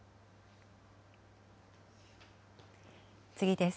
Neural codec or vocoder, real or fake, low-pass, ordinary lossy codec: none; real; none; none